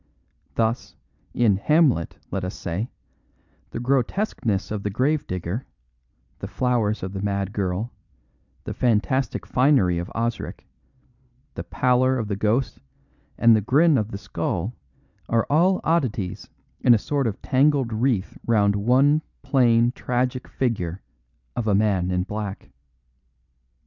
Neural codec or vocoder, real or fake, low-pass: none; real; 7.2 kHz